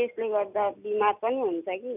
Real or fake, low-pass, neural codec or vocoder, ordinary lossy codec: real; 3.6 kHz; none; none